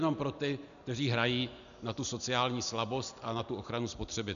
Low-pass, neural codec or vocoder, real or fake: 7.2 kHz; none; real